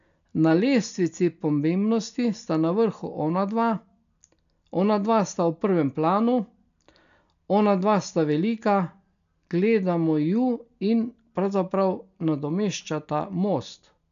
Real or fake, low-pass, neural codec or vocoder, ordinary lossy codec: real; 7.2 kHz; none; none